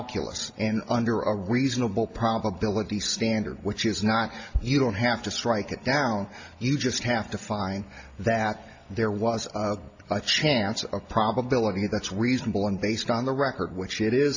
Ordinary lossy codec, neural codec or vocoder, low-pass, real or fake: AAC, 48 kbps; none; 7.2 kHz; real